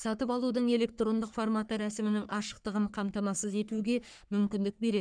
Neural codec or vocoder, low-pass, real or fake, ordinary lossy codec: codec, 44.1 kHz, 3.4 kbps, Pupu-Codec; 9.9 kHz; fake; none